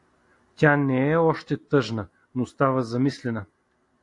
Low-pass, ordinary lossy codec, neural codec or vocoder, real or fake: 10.8 kHz; AAC, 48 kbps; none; real